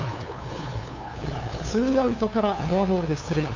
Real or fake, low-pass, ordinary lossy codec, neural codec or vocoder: fake; 7.2 kHz; AAC, 48 kbps; codec, 16 kHz, 2 kbps, FunCodec, trained on LibriTTS, 25 frames a second